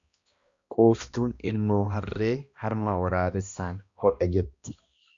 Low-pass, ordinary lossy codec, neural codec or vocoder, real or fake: 7.2 kHz; Opus, 64 kbps; codec, 16 kHz, 1 kbps, X-Codec, HuBERT features, trained on balanced general audio; fake